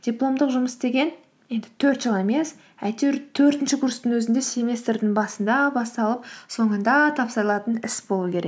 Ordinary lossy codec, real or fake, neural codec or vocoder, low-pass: none; real; none; none